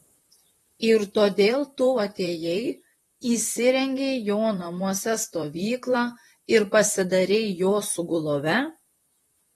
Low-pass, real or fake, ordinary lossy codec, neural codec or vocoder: 19.8 kHz; fake; AAC, 32 kbps; vocoder, 44.1 kHz, 128 mel bands, Pupu-Vocoder